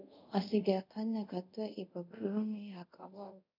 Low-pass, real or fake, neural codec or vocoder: 5.4 kHz; fake; codec, 24 kHz, 0.5 kbps, DualCodec